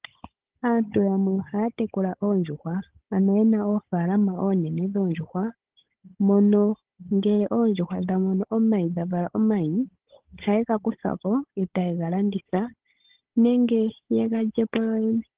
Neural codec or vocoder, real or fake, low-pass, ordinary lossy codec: codec, 16 kHz, 16 kbps, FunCodec, trained on Chinese and English, 50 frames a second; fake; 3.6 kHz; Opus, 16 kbps